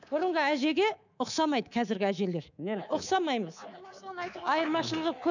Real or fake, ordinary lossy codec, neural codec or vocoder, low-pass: fake; none; codec, 24 kHz, 3.1 kbps, DualCodec; 7.2 kHz